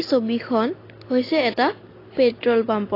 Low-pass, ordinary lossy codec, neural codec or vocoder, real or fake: 5.4 kHz; AAC, 24 kbps; none; real